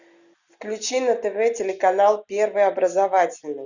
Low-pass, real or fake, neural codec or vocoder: 7.2 kHz; real; none